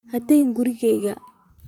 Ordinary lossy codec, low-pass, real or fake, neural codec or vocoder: none; 19.8 kHz; fake; vocoder, 44.1 kHz, 128 mel bands, Pupu-Vocoder